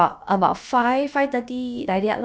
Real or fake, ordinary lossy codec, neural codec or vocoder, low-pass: fake; none; codec, 16 kHz, about 1 kbps, DyCAST, with the encoder's durations; none